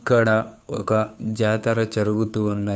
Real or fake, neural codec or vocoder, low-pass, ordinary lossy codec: fake; codec, 16 kHz, 2 kbps, FunCodec, trained on Chinese and English, 25 frames a second; none; none